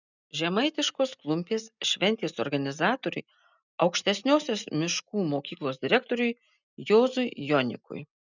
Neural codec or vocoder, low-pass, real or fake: none; 7.2 kHz; real